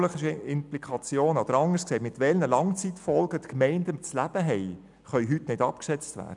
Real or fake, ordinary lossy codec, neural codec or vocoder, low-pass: real; none; none; 10.8 kHz